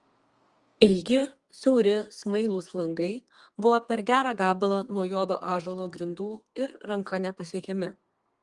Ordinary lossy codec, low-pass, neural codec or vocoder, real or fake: Opus, 24 kbps; 10.8 kHz; codec, 32 kHz, 1.9 kbps, SNAC; fake